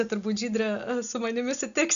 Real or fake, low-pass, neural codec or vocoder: real; 7.2 kHz; none